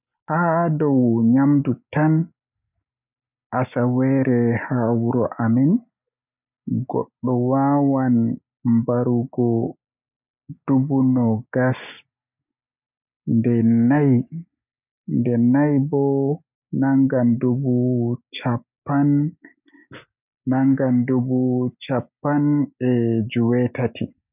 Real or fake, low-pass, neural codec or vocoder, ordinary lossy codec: real; 3.6 kHz; none; none